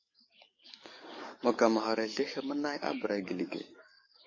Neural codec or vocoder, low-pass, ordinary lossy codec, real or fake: codec, 44.1 kHz, 7.8 kbps, DAC; 7.2 kHz; MP3, 32 kbps; fake